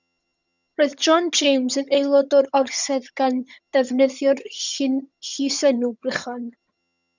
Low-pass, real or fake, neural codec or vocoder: 7.2 kHz; fake; vocoder, 22.05 kHz, 80 mel bands, HiFi-GAN